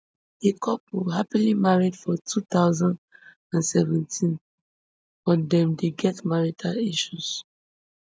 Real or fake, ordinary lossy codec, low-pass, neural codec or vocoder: real; none; none; none